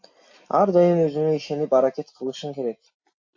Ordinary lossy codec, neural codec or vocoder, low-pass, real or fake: AAC, 48 kbps; none; 7.2 kHz; real